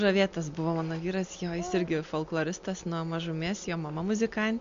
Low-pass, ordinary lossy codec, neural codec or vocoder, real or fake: 7.2 kHz; MP3, 48 kbps; none; real